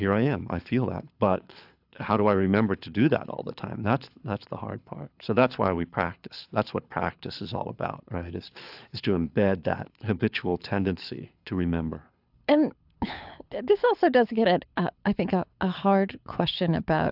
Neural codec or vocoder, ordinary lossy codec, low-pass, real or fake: codec, 24 kHz, 6 kbps, HILCodec; AAC, 48 kbps; 5.4 kHz; fake